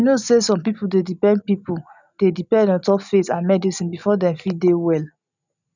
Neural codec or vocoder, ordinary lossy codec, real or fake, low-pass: none; none; real; 7.2 kHz